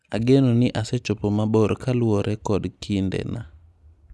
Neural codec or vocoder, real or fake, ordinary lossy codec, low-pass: none; real; none; none